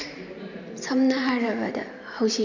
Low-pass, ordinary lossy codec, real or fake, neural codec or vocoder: 7.2 kHz; none; fake; vocoder, 44.1 kHz, 128 mel bands every 512 samples, BigVGAN v2